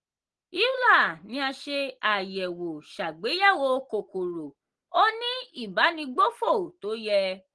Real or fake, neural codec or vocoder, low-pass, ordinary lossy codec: real; none; 10.8 kHz; Opus, 16 kbps